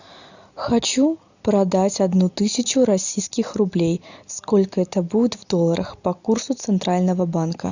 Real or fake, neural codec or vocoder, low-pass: real; none; 7.2 kHz